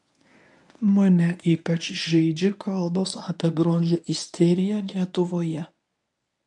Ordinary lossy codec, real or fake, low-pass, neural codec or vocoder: AAC, 64 kbps; fake; 10.8 kHz; codec, 24 kHz, 0.9 kbps, WavTokenizer, medium speech release version 1